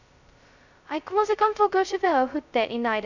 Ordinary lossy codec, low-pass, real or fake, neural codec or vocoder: none; 7.2 kHz; fake; codec, 16 kHz, 0.2 kbps, FocalCodec